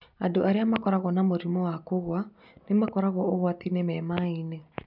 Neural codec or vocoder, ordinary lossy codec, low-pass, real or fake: none; none; 5.4 kHz; real